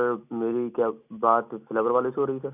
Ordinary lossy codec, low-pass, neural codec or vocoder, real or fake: none; 3.6 kHz; none; real